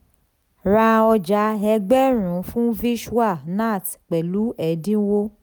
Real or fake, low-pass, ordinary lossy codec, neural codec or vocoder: real; none; none; none